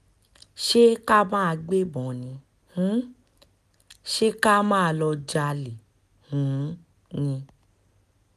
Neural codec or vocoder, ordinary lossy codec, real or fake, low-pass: none; none; real; 14.4 kHz